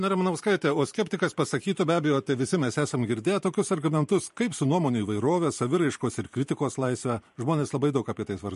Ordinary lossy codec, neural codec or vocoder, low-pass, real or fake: MP3, 48 kbps; vocoder, 44.1 kHz, 128 mel bands every 512 samples, BigVGAN v2; 14.4 kHz; fake